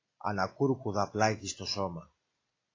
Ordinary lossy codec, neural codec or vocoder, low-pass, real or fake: AAC, 32 kbps; none; 7.2 kHz; real